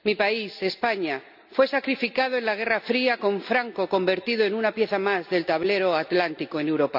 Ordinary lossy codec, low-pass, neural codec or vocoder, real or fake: none; 5.4 kHz; none; real